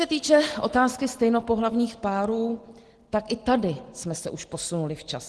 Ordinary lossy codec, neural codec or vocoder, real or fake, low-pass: Opus, 16 kbps; none; real; 10.8 kHz